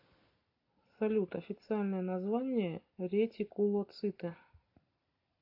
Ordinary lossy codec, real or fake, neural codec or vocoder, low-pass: AAC, 32 kbps; real; none; 5.4 kHz